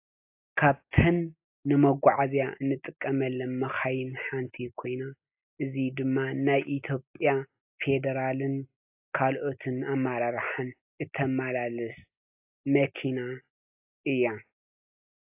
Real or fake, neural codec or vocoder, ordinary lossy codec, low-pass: real; none; AAC, 32 kbps; 3.6 kHz